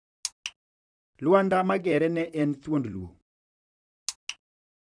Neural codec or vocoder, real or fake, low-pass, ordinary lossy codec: vocoder, 44.1 kHz, 128 mel bands, Pupu-Vocoder; fake; 9.9 kHz; none